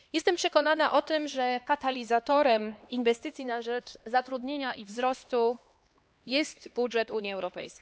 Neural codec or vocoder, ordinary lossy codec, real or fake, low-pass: codec, 16 kHz, 2 kbps, X-Codec, HuBERT features, trained on LibriSpeech; none; fake; none